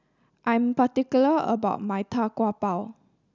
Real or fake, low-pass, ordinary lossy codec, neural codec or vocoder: real; 7.2 kHz; none; none